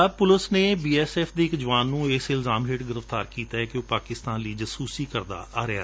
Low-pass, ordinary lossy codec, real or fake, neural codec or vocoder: none; none; real; none